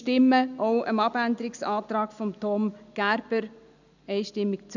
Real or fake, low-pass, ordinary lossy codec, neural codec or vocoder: real; 7.2 kHz; none; none